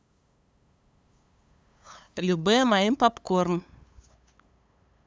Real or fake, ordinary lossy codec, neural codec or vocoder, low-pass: fake; none; codec, 16 kHz, 8 kbps, FunCodec, trained on LibriTTS, 25 frames a second; none